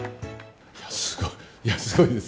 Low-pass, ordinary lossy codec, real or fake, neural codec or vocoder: none; none; real; none